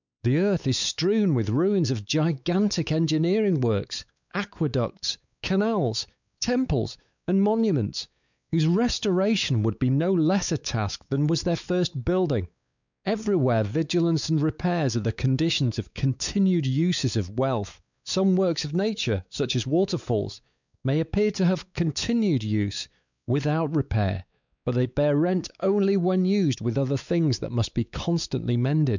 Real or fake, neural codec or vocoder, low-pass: fake; codec, 16 kHz, 4 kbps, X-Codec, WavLM features, trained on Multilingual LibriSpeech; 7.2 kHz